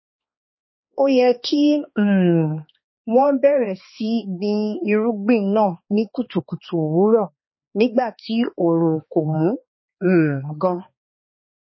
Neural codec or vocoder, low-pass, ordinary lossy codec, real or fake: codec, 16 kHz, 2 kbps, X-Codec, HuBERT features, trained on balanced general audio; 7.2 kHz; MP3, 24 kbps; fake